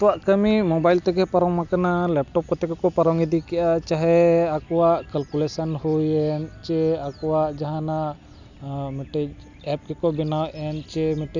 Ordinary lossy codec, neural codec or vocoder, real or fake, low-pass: none; none; real; 7.2 kHz